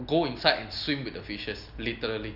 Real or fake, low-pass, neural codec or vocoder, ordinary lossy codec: real; 5.4 kHz; none; none